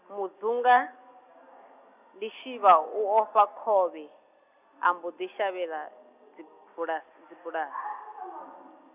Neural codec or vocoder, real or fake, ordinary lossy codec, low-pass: none; real; none; 3.6 kHz